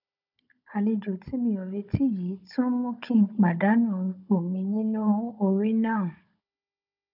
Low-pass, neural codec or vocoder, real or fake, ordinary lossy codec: 5.4 kHz; codec, 16 kHz, 16 kbps, FunCodec, trained on Chinese and English, 50 frames a second; fake; none